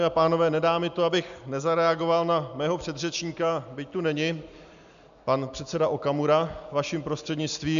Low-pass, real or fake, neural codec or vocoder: 7.2 kHz; real; none